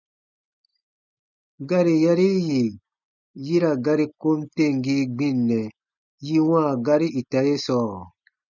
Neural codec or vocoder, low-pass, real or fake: none; 7.2 kHz; real